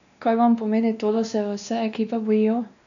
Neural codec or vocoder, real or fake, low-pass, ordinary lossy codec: codec, 16 kHz, 2 kbps, X-Codec, WavLM features, trained on Multilingual LibriSpeech; fake; 7.2 kHz; none